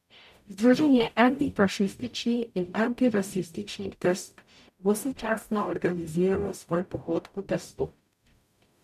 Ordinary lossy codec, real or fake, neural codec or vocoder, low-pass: none; fake; codec, 44.1 kHz, 0.9 kbps, DAC; 14.4 kHz